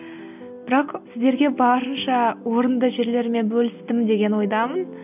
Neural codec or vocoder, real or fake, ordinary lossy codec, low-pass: none; real; none; 3.6 kHz